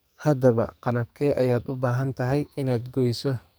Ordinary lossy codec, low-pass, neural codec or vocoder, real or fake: none; none; codec, 44.1 kHz, 2.6 kbps, SNAC; fake